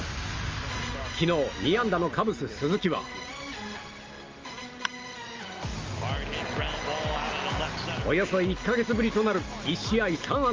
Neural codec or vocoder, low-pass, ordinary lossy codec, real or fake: none; 7.2 kHz; Opus, 32 kbps; real